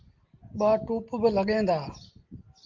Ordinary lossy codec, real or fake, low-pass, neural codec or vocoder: Opus, 32 kbps; real; 7.2 kHz; none